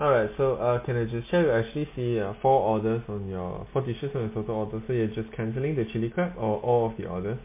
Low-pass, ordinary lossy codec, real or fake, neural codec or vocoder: 3.6 kHz; MP3, 24 kbps; real; none